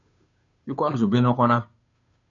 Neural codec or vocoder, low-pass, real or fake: codec, 16 kHz, 2 kbps, FunCodec, trained on Chinese and English, 25 frames a second; 7.2 kHz; fake